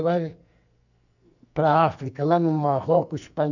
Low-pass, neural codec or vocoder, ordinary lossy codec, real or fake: 7.2 kHz; codec, 44.1 kHz, 2.6 kbps, SNAC; none; fake